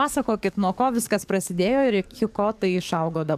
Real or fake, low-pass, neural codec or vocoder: fake; 14.4 kHz; codec, 44.1 kHz, 7.8 kbps, DAC